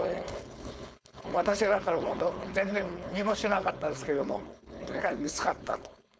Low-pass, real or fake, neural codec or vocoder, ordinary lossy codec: none; fake; codec, 16 kHz, 4.8 kbps, FACodec; none